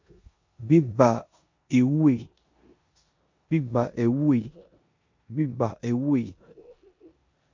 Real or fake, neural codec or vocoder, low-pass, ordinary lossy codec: fake; codec, 16 kHz in and 24 kHz out, 0.9 kbps, LongCat-Audio-Codec, four codebook decoder; 7.2 kHz; MP3, 48 kbps